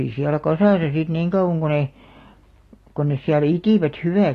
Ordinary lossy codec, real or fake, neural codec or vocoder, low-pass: AAC, 48 kbps; real; none; 14.4 kHz